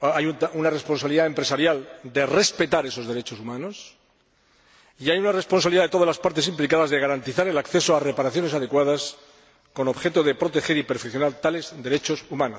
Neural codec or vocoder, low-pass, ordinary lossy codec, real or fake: none; none; none; real